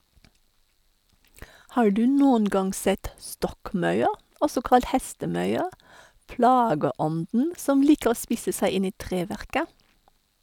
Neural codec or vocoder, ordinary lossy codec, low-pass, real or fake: none; none; 19.8 kHz; real